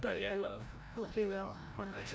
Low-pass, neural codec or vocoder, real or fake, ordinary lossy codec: none; codec, 16 kHz, 0.5 kbps, FreqCodec, larger model; fake; none